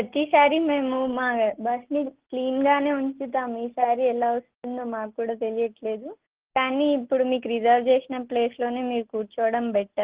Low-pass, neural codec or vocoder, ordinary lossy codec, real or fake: 3.6 kHz; none; Opus, 16 kbps; real